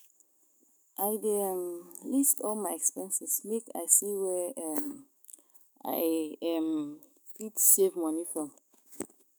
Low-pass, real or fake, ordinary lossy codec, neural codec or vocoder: none; fake; none; autoencoder, 48 kHz, 128 numbers a frame, DAC-VAE, trained on Japanese speech